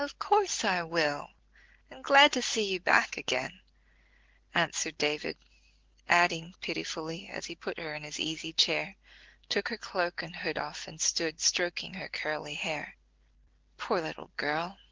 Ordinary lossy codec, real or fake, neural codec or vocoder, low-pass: Opus, 16 kbps; real; none; 7.2 kHz